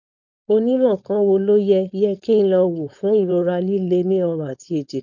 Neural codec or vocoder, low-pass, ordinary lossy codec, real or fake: codec, 16 kHz, 4.8 kbps, FACodec; 7.2 kHz; none; fake